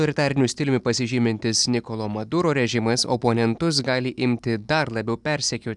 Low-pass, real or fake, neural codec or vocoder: 10.8 kHz; real; none